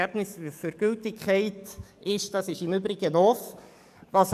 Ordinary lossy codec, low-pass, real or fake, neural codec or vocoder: none; 14.4 kHz; fake; codec, 44.1 kHz, 7.8 kbps, DAC